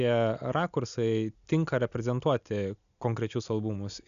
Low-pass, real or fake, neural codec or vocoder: 7.2 kHz; real; none